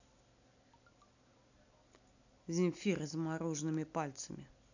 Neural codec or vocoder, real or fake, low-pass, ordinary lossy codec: none; real; 7.2 kHz; none